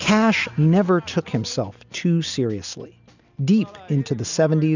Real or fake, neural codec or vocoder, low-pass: real; none; 7.2 kHz